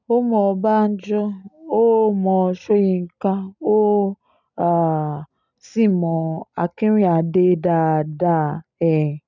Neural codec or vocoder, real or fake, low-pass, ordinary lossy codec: none; real; 7.2 kHz; none